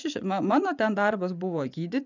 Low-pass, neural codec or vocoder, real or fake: 7.2 kHz; vocoder, 24 kHz, 100 mel bands, Vocos; fake